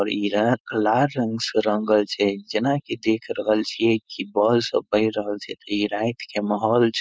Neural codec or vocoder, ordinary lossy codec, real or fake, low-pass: codec, 16 kHz, 4.8 kbps, FACodec; none; fake; none